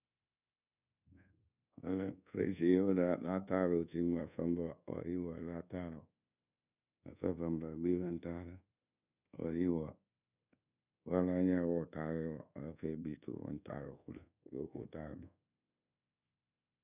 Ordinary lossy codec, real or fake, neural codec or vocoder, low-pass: none; fake; codec, 24 kHz, 1.2 kbps, DualCodec; 3.6 kHz